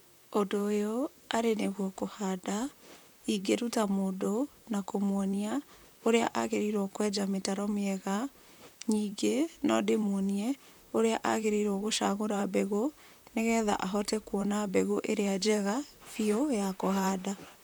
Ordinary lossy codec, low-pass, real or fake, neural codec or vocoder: none; none; fake; vocoder, 44.1 kHz, 128 mel bands, Pupu-Vocoder